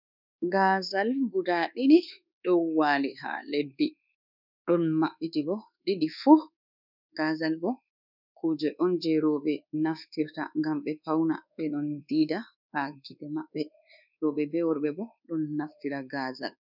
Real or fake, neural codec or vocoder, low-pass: fake; codec, 24 kHz, 1.2 kbps, DualCodec; 5.4 kHz